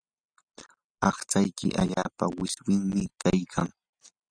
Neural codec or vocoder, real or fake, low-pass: none; real; 9.9 kHz